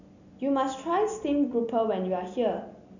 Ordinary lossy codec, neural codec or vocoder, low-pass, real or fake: none; none; 7.2 kHz; real